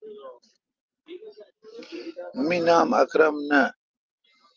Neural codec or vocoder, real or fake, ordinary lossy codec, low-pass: none; real; Opus, 32 kbps; 7.2 kHz